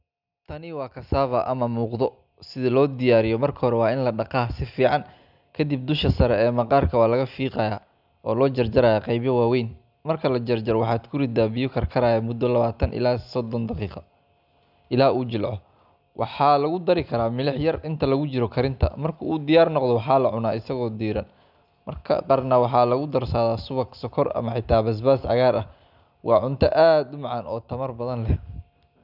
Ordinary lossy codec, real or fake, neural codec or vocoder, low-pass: none; real; none; 5.4 kHz